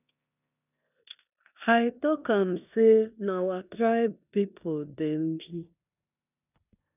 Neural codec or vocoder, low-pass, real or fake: codec, 16 kHz in and 24 kHz out, 0.9 kbps, LongCat-Audio-Codec, four codebook decoder; 3.6 kHz; fake